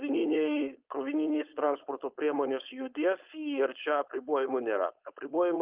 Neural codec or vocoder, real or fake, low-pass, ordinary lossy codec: codec, 16 kHz, 4.8 kbps, FACodec; fake; 3.6 kHz; Opus, 24 kbps